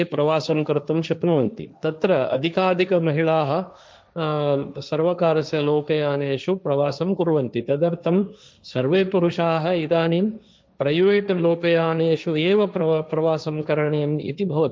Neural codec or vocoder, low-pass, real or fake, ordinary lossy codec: codec, 16 kHz, 1.1 kbps, Voila-Tokenizer; none; fake; none